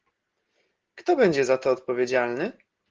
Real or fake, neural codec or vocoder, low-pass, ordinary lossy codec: real; none; 7.2 kHz; Opus, 16 kbps